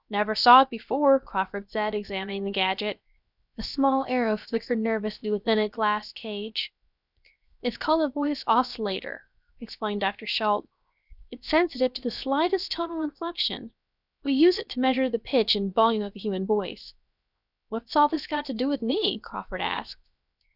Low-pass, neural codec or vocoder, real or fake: 5.4 kHz; codec, 16 kHz, 0.7 kbps, FocalCodec; fake